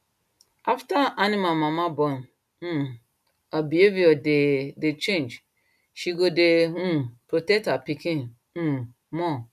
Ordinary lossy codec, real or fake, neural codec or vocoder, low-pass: none; real; none; 14.4 kHz